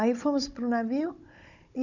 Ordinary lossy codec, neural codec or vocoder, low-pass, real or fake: none; codec, 16 kHz, 16 kbps, FunCodec, trained on Chinese and English, 50 frames a second; 7.2 kHz; fake